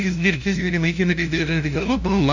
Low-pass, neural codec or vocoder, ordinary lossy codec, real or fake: 7.2 kHz; codec, 16 kHz, 0.5 kbps, FunCodec, trained on LibriTTS, 25 frames a second; none; fake